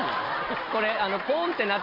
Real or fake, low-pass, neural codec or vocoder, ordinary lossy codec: real; 5.4 kHz; none; none